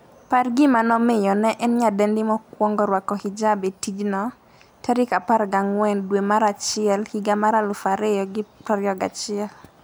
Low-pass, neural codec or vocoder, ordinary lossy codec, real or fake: none; none; none; real